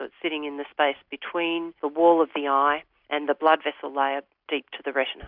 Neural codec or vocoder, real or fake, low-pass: none; real; 5.4 kHz